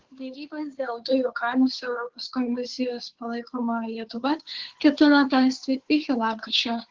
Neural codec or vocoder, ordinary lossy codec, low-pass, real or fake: codec, 16 kHz, 2 kbps, FunCodec, trained on Chinese and English, 25 frames a second; Opus, 16 kbps; 7.2 kHz; fake